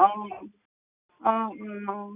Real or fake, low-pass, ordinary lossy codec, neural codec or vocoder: real; 3.6 kHz; none; none